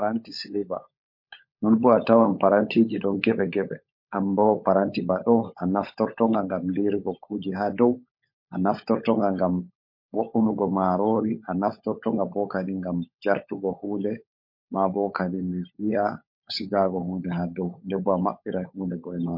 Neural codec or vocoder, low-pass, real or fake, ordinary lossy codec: codec, 16 kHz, 16 kbps, FunCodec, trained on LibriTTS, 50 frames a second; 5.4 kHz; fake; MP3, 32 kbps